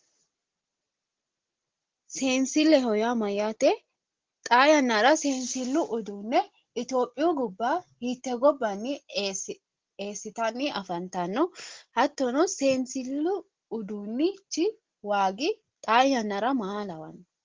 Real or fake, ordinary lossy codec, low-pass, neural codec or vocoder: real; Opus, 16 kbps; 7.2 kHz; none